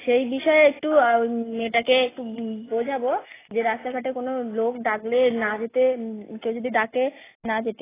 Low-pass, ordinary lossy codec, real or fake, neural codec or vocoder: 3.6 kHz; AAC, 16 kbps; real; none